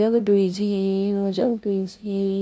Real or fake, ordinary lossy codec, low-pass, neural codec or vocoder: fake; none; none; codec, 16 kHz, 0.5 kbps, FunCodec, trained on LibriTTS, 25 frames a second